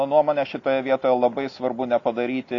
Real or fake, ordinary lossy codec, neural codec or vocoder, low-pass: real; AAC, 48 kbps; none; 7.2 kHz